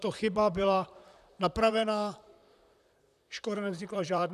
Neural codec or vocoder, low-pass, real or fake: vocoder, 44.1 kHz, 128 mel bands, Pupu-Vocoder; 14.4 kHz; fake